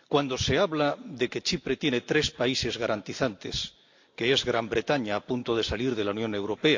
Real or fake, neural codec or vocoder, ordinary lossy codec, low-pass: real; none; AAC, 48 kbps; 7.2 kHz